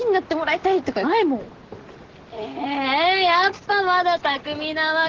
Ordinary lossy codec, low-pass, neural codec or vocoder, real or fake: Opus, 16 kbps; 7.2 kHz; none; real